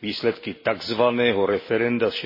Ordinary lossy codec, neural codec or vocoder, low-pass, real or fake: MP3, 24 kbps; none; 5.4 kHz; real